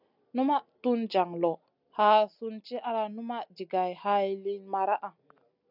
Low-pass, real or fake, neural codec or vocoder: 5.4 kHz; real; none